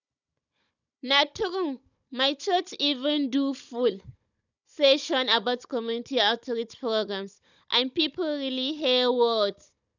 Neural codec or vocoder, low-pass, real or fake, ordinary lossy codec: codec, 16 kHz, 16 kbps, FunCodec, trained on Chinese and English, 50 frames a second; 7.2 kHz; fake; none